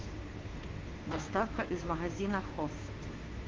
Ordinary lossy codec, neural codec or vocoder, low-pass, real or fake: Opus, 16 kbps; codec, 16 kHz, 2 kbps, FunCodec, trained on Chinese and English, 25 frames a second; 7.2 kHz; fake